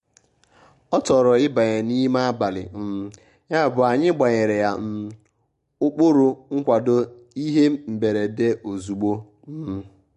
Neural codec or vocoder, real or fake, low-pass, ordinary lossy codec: none; real; 10.8 kHz; MP3, 48 kbps